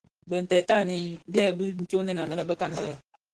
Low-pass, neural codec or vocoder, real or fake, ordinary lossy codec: 10.8 kHz; autoencoder, 48 kHz, 32 numbers a frame, DAC-VAE, trained on Japanese speech; fake; Opus, 16 kbps